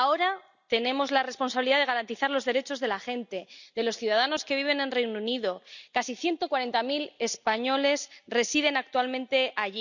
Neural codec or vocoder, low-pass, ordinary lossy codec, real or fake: none; 7.2 kHz; none; real